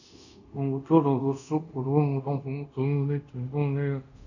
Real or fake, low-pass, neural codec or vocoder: fake; 7.2 kHz; codec, 24 kHz, 0.5 kbps, DualCodec